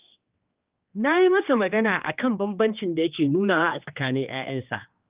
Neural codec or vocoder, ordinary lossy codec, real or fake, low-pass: codec, 16 kHz, 2 kbps, X-Codec, HuBERT features, trained on general audio; Opus, 24 kbps; fake; 3.6 kHz